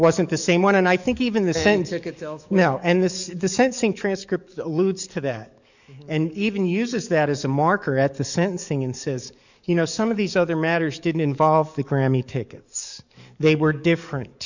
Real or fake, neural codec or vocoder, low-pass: fake; codec, 44.1 kHz, 7.8 kbps, DAC; 7.2 kHz